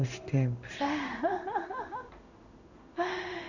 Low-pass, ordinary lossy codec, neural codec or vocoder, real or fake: 7.2 kHz; none; codec, 16 kHz in and 24 kHz out, 1 kbps, XY-Tokenizer; fake